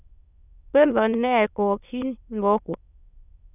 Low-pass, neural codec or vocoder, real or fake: 3.6 kHz; autoencoder, 22.05 kHz, a latent of 192 numbers a frame, VITS, trained on many speakers; fake